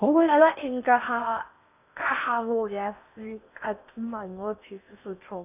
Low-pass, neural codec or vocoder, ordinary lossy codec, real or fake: 3.6 kHz; codec, 16 kHz in and 24 kHz out, 0.6 kbps, FocalCodec, streaming, 4096 codes; none; fake